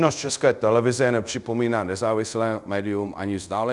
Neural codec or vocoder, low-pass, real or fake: codec, 24 kHz, 0.5 kbps, DualCodec; 10.8 kHz; fake